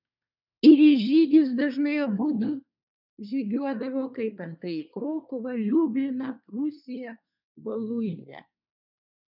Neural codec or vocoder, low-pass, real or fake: codec, 24 kHz, 1 kbps, SNAC; 5.4 kHz; fake